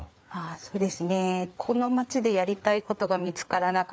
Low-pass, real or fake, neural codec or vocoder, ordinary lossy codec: none; fake; codec, 16 kHz, 4 kbps, FreqCodec, larger model; none